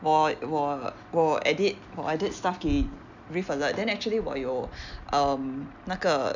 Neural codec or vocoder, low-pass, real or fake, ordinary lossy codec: none; 7.2 kHz; real; none